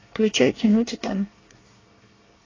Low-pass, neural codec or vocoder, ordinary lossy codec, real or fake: 7.2 kHz; codec, 24 kHz, 1 kbps, SNAC; AAC, 32 kbps; fake